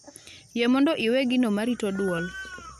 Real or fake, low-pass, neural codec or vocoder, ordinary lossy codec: real; 14.4 kHz; none; none